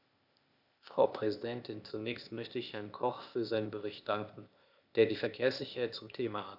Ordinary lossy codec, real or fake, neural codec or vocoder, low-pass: none; fake; codec, 16 kHz, 0.8 kbps, ZipCodec; 5.4 kHz